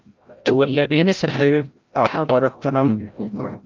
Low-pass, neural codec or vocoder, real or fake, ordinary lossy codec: 7.2 kHz; codec, 16 kHz, 0.5 kbps, FreqCodec, larger model; fake; Opus, 24 kbps